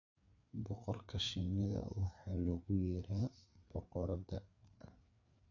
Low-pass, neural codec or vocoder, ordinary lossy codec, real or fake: 7.2 kHz; codec, 16 kHz, 4 kbps, FreqCodec, larger model; none; fake